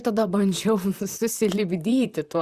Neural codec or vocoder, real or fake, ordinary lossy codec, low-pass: vocoder, 44.1 kHz, 128 mel bands, Pupu-Vocoder; fake; Opus, 64 kbps; 14.4 kHz